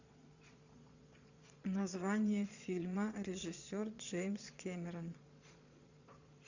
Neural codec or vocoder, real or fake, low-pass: vocoder, 22.05 kHz, 80 mel bands, Vocos; fake; 7.2 kHz